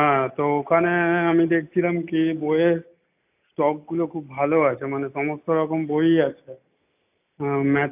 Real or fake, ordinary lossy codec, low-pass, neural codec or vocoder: real; AAC, 32 kbps; 3.6 kHz; none